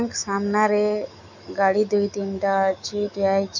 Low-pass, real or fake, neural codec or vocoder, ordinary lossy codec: 7.2 kHz; fake; autoencoder, 48 kHz, 128 numbers a frame, DAC-VAE, trained on Japanese speech; none